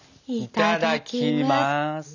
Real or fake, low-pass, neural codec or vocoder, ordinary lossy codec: real; 7.2 kHz; none; none